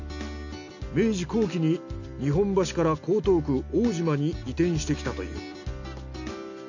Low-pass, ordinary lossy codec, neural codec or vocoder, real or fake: 7.2 kHz; none; none; real